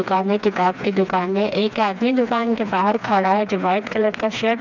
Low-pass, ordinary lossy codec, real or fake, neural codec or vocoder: 7.2 kHz; none; fake; codec, 16 kHz, 2 kbps, FreqCodec, smaller model